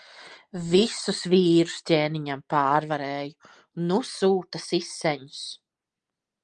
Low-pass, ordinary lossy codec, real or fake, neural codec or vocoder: 9.9 kHz; Opus, 32 kbps; real; none